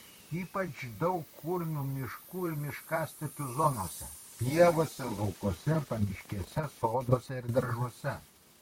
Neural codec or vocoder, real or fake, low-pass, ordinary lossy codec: vocoder, 44.1 kHz, 128 mel bands, Pupu-Vocoder; fake; 19.8 kHz; MP3, 64 kbps